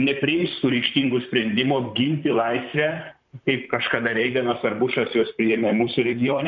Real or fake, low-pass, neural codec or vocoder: fake; 7.2 kHz; vocoder, 44.1 kHz, 128 mel bands, Pupu-Vocoder